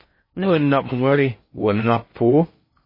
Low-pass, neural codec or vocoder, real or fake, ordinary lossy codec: 5.4 kHz; codec, 16 kHz in and 24 kHz out, 0.6 kbps, FocalCodec, streaming, 2048 codes; fake; MP3, 24 kbps